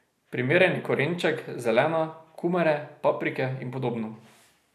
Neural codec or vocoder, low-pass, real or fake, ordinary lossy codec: none; 14.4 kHz; real; none